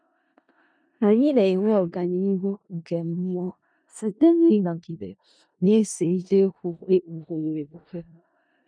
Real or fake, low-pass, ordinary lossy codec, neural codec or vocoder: fake; 9.9 kHz; none; codec, 16 kHz in and 24 kHz out, 0.4 kbps, LongCat-Audio-Codec, four codebook decoder